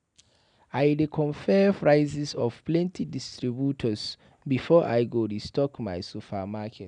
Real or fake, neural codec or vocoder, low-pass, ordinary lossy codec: real; none; 9.9 kHz; none